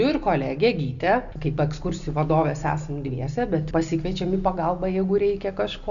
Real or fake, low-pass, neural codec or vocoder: real; 7.2 kHz; none